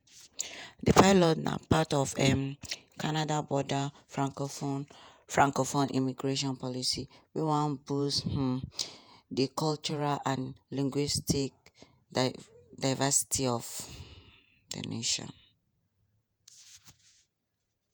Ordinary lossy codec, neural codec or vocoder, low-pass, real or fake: none; vocoder, 48 kHz, 128 mel bands, Vocos; none; fake